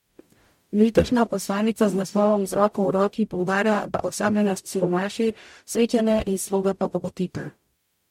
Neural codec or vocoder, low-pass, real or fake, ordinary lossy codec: codec, 44.1 kHz, 0.9 kbps, DAC; 19.8 kHz; fake; MP3, 64 kbps